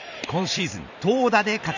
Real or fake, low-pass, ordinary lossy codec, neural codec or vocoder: fake; 7.2 kHz; none; vocoder, 44.1 kHz, 128 mel bands every 512 samples, BigVGAN v2